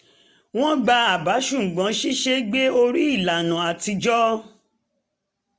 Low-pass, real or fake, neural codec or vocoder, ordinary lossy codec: none; real; none; none